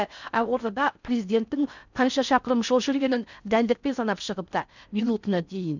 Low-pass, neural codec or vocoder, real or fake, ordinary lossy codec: 7.2 kHz; codec, 16 kHz in and 24 kHz out, 0.6 kbps, FocalCodec, streaming, 4096 codes; fake; none